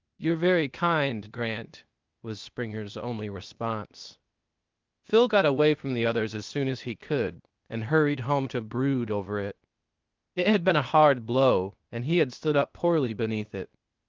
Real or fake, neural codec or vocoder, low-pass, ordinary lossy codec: fake; codec, 16 kHz, 0.8 kbps, ZipCodec; 7.2 kHz; Opus, 24 kbps